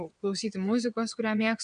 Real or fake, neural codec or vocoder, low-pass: fake; vocoder, 22.05 kHz, 80 mel bands, WaveNeXt; 9.9 kHz